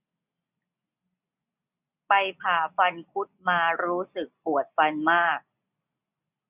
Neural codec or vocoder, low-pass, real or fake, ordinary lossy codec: none; 3.6 kHz; real; none